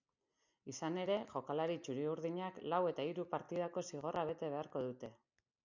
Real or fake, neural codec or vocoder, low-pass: real; none; 7.2 kHz